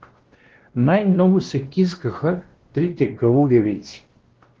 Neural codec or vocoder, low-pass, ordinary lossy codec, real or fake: codec, 16 kHz, 1 kbps, X-Codec, WavLM features, trained on Multilingual LibriSpeech; 7.2 kHz; Opus, 16 kbps; fake